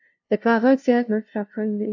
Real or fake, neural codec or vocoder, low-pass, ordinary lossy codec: fake; codec, 16 kHz, 0.5 kbps, FunCodec, trained on LibriTTS, 25 frames a second; 7.2 kHz; AAC, 48 kbps